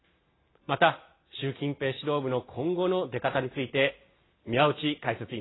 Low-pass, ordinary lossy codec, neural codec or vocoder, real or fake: 7.2 kHz; AAC, 16 kbps; none; real